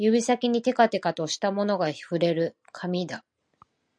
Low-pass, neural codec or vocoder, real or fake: 9.9 kHz; none; real